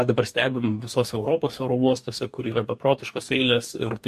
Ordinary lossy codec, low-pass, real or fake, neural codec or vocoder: MP3, 64 kbps; 14.4 kHz; fake; codec, 44.1 kHz, 2.6 kbps, DAC